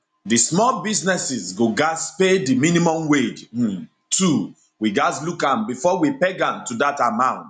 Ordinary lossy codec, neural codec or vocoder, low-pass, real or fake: none; none; 9.9 kHz; real